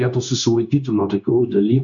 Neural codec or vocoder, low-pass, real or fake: codec, 16 kHz, 0.9 kbps, LongCat-Audio-Codec; 7.2 kHz; fake